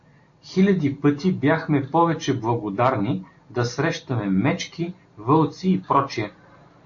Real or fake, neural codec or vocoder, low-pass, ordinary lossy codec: real; none; 7.2 kHz; AAC, 48 kbps